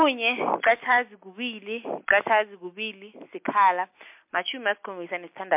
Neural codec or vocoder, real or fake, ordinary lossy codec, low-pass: none; real; MP3, 32 kbps; 3.6 kHz